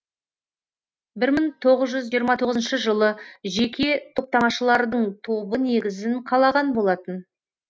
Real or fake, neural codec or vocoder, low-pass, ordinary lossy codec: real; none; none; none